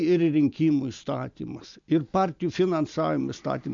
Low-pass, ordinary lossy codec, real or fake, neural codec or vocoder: 7.2 kHz; MP3, 64 kbps; real; none